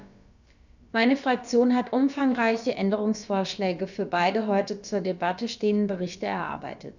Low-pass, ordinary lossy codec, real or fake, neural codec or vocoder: 7.2 kHz; none; fake; codec, 16 kHz, about 1 kbps, DyCAST, with the encoder's durations